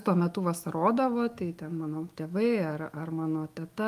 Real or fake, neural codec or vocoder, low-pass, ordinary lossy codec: fake; autoencoder, 48 kHz, 128 numbers a frame, DAC-VAE, trained on Japanese speech; 14.4 kHz; Opus, 32 kbps